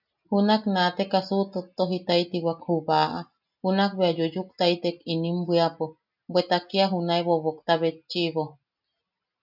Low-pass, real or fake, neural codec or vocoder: 5.4 kHz; real; none